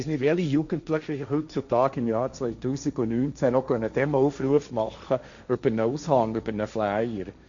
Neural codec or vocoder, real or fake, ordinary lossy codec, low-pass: codec, 16 kHz, 1.1 kbps, Voila-Tokenizer; fake; MP3, 96 kbps; 7.2 kHz